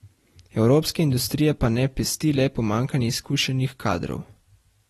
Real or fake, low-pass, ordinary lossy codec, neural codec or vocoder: real; 19.8 kHz; AAC, 32 kbps; none